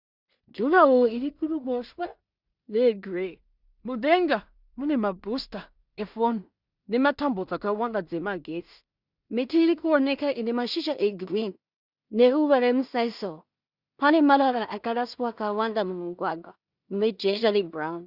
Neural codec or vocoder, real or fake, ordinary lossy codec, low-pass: codec, 16 kHz in and 24 kHz out, 0.4 kbps, LongCat-Audio-Codec, two codebook decoder; fake; Opus, 64 kbps; 5.4 kHz